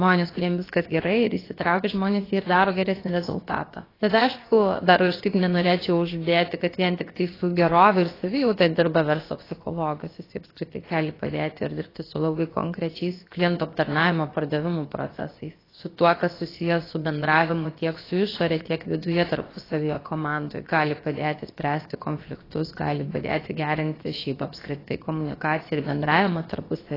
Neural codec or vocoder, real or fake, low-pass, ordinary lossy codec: codec, 16 kHz, about 1 kbps, DyCAST, with the encoder's durations; fake; 5.4 kHz; AAC, 24 kbps